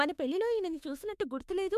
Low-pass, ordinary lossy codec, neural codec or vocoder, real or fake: 14.4 kHz; MP3, 96 kbps; autoencoder, 48 kHz, 32 numbers a frame, DAC-VAE, trained on Japanese speech; fake